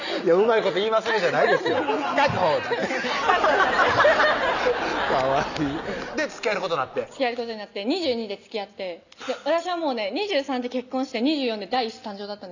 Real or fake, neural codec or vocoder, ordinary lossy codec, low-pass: real; none; none; 7.2 kHz